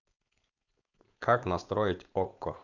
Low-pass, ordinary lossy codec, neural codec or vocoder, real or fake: 7.2 kHz; none; codec, 16 kHz, 4.8 kbps, FACodec; fake